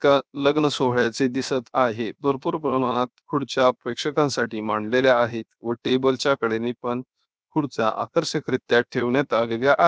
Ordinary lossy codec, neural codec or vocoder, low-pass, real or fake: none; codec, 16 kHz, 0.7 kbps, FocalCodec; none; fake